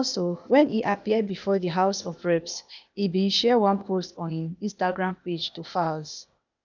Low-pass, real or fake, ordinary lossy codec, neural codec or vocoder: 7.2 kHz; fake; none; codec, 16 kHz, 0.8 kbps, ZipCodec